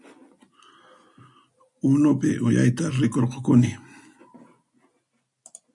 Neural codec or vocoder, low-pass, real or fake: none; 10.8 kHz; real